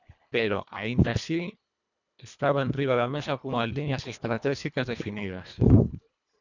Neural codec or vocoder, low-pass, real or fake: codec, 24 kHz, 1.5 kbps, HILCodec; 7.2 kHz; fake